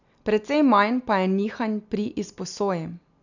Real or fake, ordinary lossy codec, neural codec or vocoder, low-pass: real; none; none; 7.2 kHz